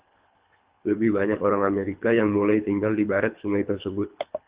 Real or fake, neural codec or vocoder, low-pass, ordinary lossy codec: fake; codec, 24 kHz, 3 kbps, HILCodec; 3.6 kHz; Opus, 32 kbps